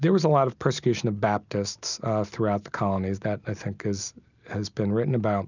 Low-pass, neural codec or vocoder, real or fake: 7.2 kHz; none; real